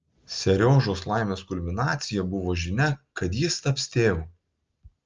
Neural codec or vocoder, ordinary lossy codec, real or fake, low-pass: none; Opus, 24 kbps; real; 7.2 kHz